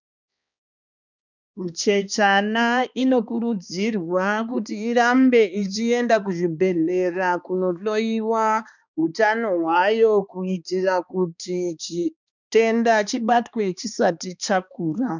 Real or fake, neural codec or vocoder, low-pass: fake; codec, 16 kHz, 2 kbps, X-Codec, HuBERT features, trained on balanced general audio; 7.2 kHz